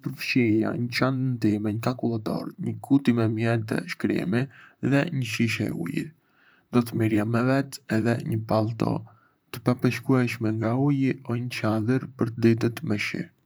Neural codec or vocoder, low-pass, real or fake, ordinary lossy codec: vocoder, 44.1 kHz, 128 mel bands, Pupu-Vocoder; none; fake; none